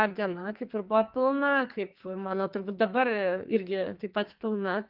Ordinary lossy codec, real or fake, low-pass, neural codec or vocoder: Opus, 24 kbps; fake; 5.4 kHz; codec, 44.1 kHz, 2.6 kbps, SNAC